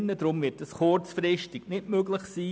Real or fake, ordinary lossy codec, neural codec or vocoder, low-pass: real; none; none; none